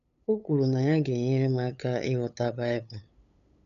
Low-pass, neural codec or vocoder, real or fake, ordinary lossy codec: 7.2 kHz; codec, 16 kHz, 8 kbps, FunCodec, trained on LibriTTS, 25 frames a second; fake; none